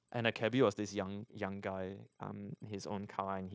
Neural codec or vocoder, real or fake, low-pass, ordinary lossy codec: codec, 16 kHz, 0.9 kbps, LongCat-Audio-Codec; fake; none; none